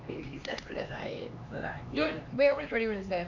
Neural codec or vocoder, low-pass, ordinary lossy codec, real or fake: codec, 16 kHz, 2 kbps, X-Codec, HuBERT features, trained on LibriSpeech; 7.2 kHz; none; fake